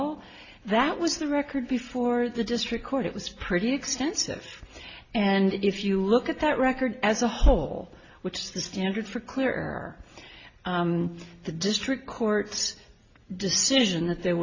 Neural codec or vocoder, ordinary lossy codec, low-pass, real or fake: none; AAC, 32 kbps; 7.2 kHz; real